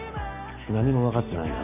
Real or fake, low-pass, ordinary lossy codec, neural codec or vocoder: real; 3.6 kHz; none; none